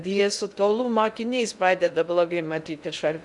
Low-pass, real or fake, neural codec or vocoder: 10.8 kHz; fake; codec, 16 kHz in and 24 kHz out, 0.6 kbps, FocalCodec, streaming, 2048 codes